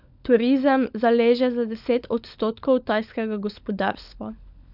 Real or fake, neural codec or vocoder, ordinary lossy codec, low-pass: fake; codec, 16 kHz, 16 kbps, FunCodec, trained on LibriTTS, 50 frames a second; none; 5.4 kHz